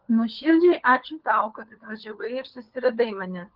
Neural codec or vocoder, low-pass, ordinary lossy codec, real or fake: codec, 16 kHz, 4 kbps, FunCodec, trained on LibriTTS, 50 frames a second; 5.4 kHz; Opus, 16 kbps; fake